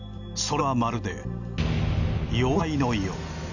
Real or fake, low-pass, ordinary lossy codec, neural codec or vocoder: real; 7.2 kHz; none; none